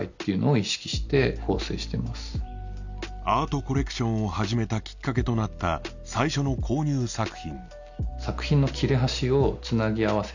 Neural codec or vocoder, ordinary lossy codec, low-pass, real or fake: none; none; 7.2 kHz; real